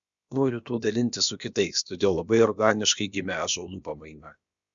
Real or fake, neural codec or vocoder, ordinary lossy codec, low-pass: fake; codec, 16 kHz, about 1 kbps, DyCAST, with the encoder's durations; Opus, 64 kbps; 7.2 kHz